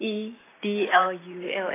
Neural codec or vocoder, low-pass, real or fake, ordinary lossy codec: vocoder, 44.1 kHz, 128 mel bands every 512 samples, BigVGAN v2; 3.6 kHz; fake; AAC, 16 kbps